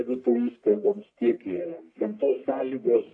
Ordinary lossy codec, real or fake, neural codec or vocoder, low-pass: AAC, 48 kbps; fake; codec, 44.1 kHz, 1.7 kbps, Pupu-Codec; 9.9 kHz